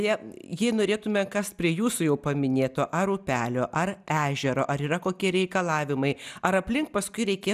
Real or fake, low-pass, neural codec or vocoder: real; 14.4 kHz; none